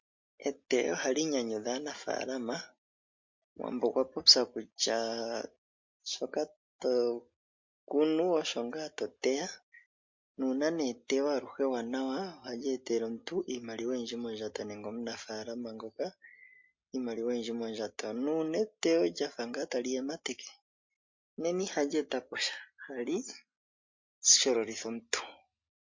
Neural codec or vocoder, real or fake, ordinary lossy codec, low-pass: none; real; MP3, 48 kbps; 7.2 kHz